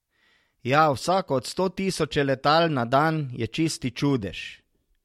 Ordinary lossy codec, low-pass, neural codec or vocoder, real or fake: MP3, 64 kbps; 19.8 kHz; none; real